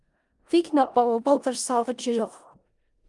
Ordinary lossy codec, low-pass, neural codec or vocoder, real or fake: Opus, 32 kbps; 10.8 kHz; codec, 16 kHz in and 24 kHz out, 0.4 kbps, LongCat-Audio-Codec, four codebook decoder; fake